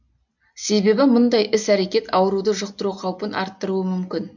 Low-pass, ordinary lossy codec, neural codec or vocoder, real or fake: 7.2 kHz; none; none; real